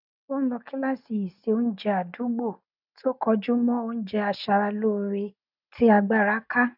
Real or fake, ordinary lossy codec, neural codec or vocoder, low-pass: real; none; none; 5.4 kHz